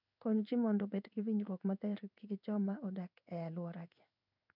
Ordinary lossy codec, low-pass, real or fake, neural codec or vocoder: none; 5.4 kHz; fake; codec, 16 kHz in and 24 kHz out, 1 kbps, XY-Tokenizer